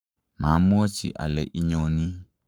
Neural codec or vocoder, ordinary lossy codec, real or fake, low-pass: codec, 44.1 kHz, 7.8 kbps, Pupu-Codec; none; fake; none